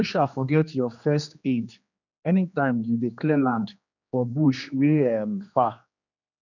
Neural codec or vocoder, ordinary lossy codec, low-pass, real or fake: codec, 16 kHz, 2 kbps, X-Codec, HuBERT features, trained on general audio; none; 7.2 kHz; fake